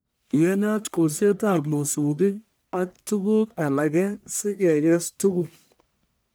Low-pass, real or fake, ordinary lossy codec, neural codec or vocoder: none; fake; none; codec, 44.1 kHz, 1.7 kbps, Pupu-Codec